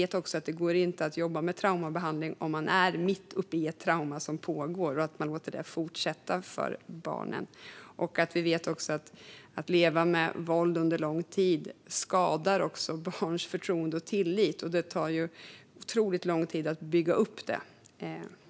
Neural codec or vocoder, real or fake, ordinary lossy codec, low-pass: none; real; none; none